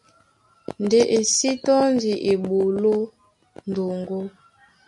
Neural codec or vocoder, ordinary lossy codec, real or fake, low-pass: none; MP3, 96 kbps; real; 10.8 kHz